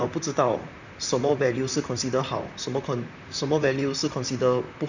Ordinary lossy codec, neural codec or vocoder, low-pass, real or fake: none; vocoder, 44.1 kHz, 128 mel bands, Pupu-Vocoder; 7.2 kHz; fake